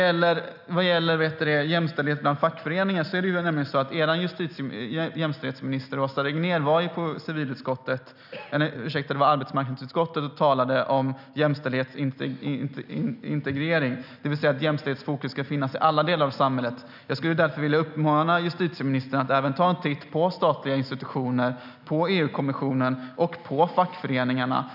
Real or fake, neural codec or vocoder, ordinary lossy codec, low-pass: real; none; none; 5.4 kHz